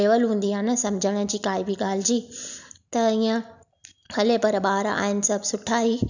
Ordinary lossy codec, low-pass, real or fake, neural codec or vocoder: none; 7.2 kHz; real; none